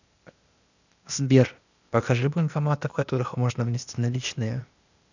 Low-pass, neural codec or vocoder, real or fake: 7.2 kHz; codec, 16 kHz, 0.8 kbps, ZipCodec; fake